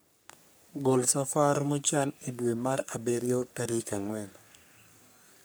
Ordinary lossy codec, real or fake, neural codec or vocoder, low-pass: none; fake; codec, 44.1 kHz, 3.4 kbps, Pupu-Codec; none